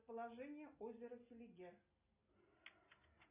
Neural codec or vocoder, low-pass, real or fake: none; 3.6 kHz; real